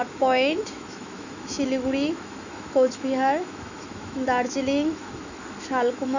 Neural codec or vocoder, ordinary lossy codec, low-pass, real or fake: autoencoder, 48 kHz, 128 numbers a frame, DAC-VAE, trained on Japanese speech; none; 7.2 kHz; fake